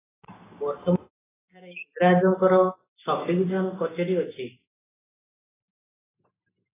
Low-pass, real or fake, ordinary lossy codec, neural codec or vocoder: 3.6 kHz; real; AAC, 16 kbps; none